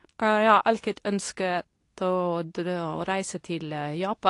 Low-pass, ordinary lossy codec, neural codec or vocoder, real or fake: 10.8 kHz; AAC, 48 kbps; codec, 24 kHz, 0.9 kbps, WavTokenizer, medium speech release version 2; fake